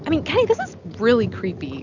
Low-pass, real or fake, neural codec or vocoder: 7.2 kHz; real; none